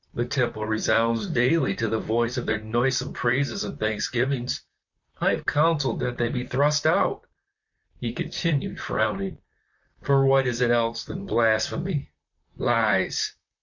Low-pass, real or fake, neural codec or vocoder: 7.2 kHz; fake; vocoder, 44.1 kHz, 128 mel bands, Pupu-Vocoder